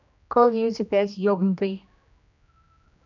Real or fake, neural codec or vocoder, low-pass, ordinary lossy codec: fake; codec, 16 kHz, 1 kbps, X-Codec, HuBERT features, trained on general audio; 7.2 kHz; none